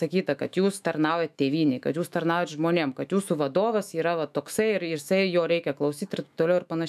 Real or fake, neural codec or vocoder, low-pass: fake; autoencoder, 48 kHz, 128 numbers a frame, DAC-VAE, trained on Japanese speech; 14.4 kHz